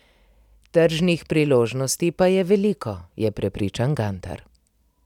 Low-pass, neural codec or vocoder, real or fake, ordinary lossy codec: 19.8 kHz; none; real; none